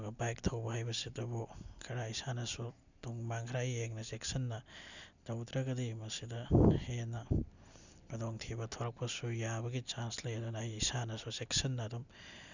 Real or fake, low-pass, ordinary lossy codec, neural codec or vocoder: real; 7.2 kHz; none; none